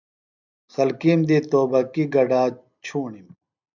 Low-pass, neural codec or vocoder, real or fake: 7.2 kHz; none; real